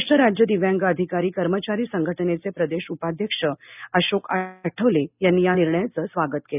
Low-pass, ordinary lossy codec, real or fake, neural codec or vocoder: 3.6 kHz; none; real; none